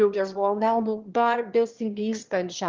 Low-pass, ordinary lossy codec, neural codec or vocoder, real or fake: 7.2 kHz; Opus, 16 kbps; autoencoder, 22.05 kHz, a latent of 192 numbers a frame, VITS, trained on one speaker; fake